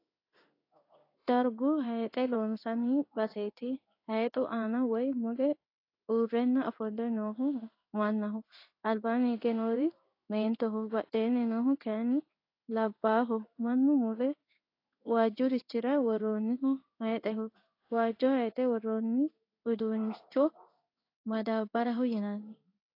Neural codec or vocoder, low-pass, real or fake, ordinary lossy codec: codec, 16 kHz in and 24 kHz out, 1 kbps, XY-Tokenizer; 5.4 kHz; fake; AAC, 32 kbps